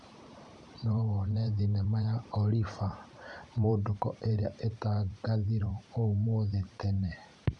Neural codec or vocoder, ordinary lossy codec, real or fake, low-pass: vocoder, 44.1 kHz, 128 mel bands every 512 samples, BigVGAN v2; none; fake; 10.8 kHz